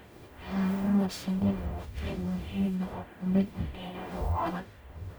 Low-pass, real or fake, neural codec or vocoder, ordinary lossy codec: none; fake; codec, 44.1 kHz, 0.9 kbps, DAC; none